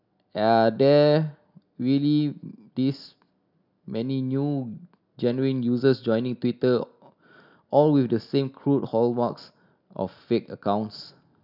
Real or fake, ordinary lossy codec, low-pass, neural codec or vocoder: real; none; 5.4 kHz; none